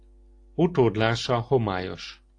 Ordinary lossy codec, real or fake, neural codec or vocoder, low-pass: AAC, 48 kbps; real; none; 9.9 kHz